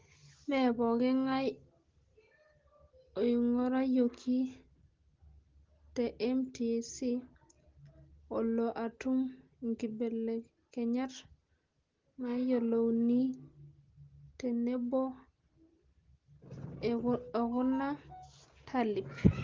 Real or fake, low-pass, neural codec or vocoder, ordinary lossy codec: real; 7.2 kHz; none; Opus, 16 kbps